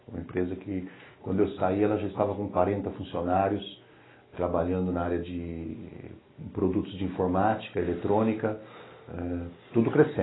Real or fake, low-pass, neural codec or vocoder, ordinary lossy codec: real; 7.2 kHz; none; AAC, 16 kbps